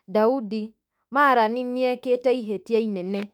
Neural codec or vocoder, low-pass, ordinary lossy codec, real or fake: autoencoder, 48 kHz, 32 numbers a frame, DAC-VAE, trained on Japanese speech; 19.8 kHz; none; fake